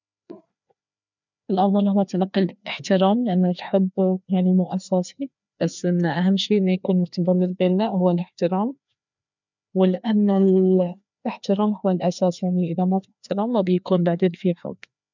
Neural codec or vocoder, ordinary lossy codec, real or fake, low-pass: codec, 16 kHz, 2 kbps, FreqCodec, larger model; none; fake; 7.2 kHz